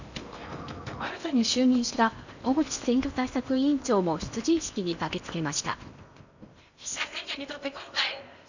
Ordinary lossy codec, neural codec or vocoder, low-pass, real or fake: none; codec, 16 kHz in and 24 kHz out, 0.8 kbps, FocalCodec, streaming, 65536 codes; 7.2 kHz; fake